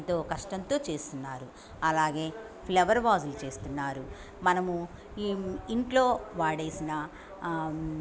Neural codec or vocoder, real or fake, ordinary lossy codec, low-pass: none; real; none; none